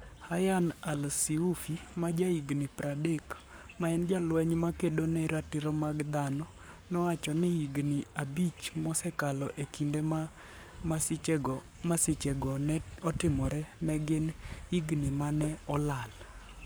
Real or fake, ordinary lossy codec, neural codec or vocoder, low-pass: fake; none; codec, 44.1 kHz, 7.8 kbps, Pupu-Codec; none